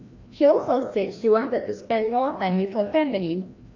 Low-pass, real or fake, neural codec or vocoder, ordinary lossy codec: 7.2 kHz; fake; codec, 16 kHz, 1 kbps, FreqCodec, larger model; AAC, 48 kbps